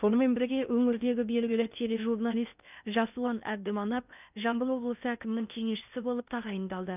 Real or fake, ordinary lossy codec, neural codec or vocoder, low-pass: fake; none; codec, 16 kHz in and 24 kHz out, 0.8 kbps, FocalCodec, streaming, 65536 codes; 3.6 kHz